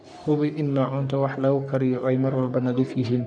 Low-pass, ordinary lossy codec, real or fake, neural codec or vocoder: 9.9 kHz; none; fake; codec, 44.1 kHz, 3.4 kbps, Pupu-Codec